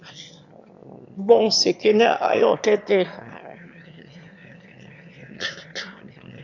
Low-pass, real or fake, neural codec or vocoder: 7.2 kHz; fake; autoencoder, 22.05 kHz, a latent of 192 numbers a frame, VITS, trained on one speaker